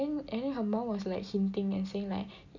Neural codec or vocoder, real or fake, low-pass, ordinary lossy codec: none; real; 7.2 kHz; none